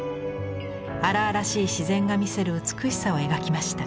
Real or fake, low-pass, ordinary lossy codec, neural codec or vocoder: real; none; none; none